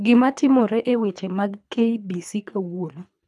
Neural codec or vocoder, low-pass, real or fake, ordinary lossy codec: codec, 24 kHz, 3 kbps, HILCodec; none; fake; none